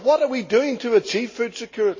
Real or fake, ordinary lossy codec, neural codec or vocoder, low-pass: real; MP3, 32 kbps; none; 7.2 kHz